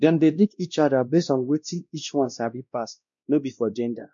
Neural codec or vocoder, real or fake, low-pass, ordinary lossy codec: codec, 16 kHz, 1 kbps, X-Codec, WavLM features, trained on Multilingual LibriSpeech; fake; 7.2 kHz; MP3, 64 kbps